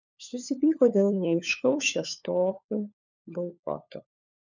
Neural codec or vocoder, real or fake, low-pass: codec, 16 kHz, 4 kbps, FunCodec, trained on LibriTTS, 50 frames a second; fake; 7.2 kHz